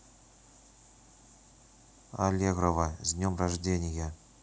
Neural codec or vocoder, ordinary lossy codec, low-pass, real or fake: none; none; none; real